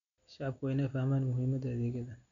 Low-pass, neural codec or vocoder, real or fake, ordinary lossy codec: 7.2 kHz; none; real; none